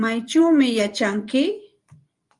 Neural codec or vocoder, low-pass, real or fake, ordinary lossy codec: vocoder, 24 kHz, 100 mel bands, Vocos; 10.8 kHz; fake; Opus, 32 kbps